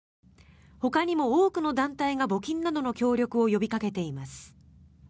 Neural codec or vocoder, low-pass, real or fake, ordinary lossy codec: none; none; real; none